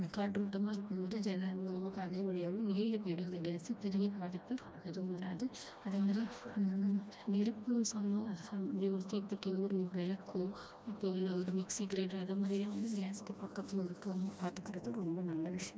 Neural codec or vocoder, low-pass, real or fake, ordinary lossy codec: codec, 16 kHz, 1 kbps, FreqCodec, smaller model; none; fake; none